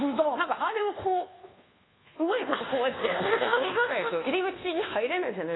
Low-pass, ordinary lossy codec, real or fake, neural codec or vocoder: 7.2 kHz; AAC, 16 kbps; fake; codec, 24 kHz, 1.2 kbps, DualCodec